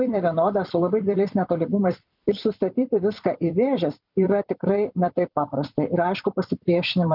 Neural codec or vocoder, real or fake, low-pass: vocoder, 44.1 kHz, 128 mel bands every 256 samples, BigVGAN v2; fake; 5.4 kHz